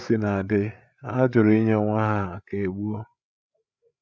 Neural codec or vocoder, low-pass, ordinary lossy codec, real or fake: codec, 16 kHz, 8 kbps, FreqCodec, larger model; none; none; fake